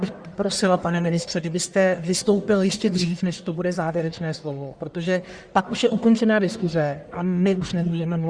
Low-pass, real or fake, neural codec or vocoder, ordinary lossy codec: 9.9 kHz; fake; codec, 44.1 kHz, 1.7 kbps, Pupu-Codec; Opus, 64 kbps